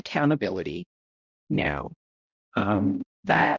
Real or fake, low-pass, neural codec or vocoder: fake; 7.2 kHz; codec, 16 kHz, 0.5 kbps, X-Codec, HuBERT features, trained on balanced general audio